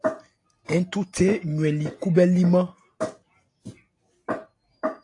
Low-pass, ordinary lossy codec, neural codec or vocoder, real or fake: 10.8 kHz; AAC, 48 kbps; none; real